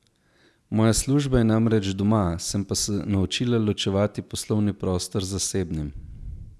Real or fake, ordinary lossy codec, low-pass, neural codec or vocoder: real; none; none; none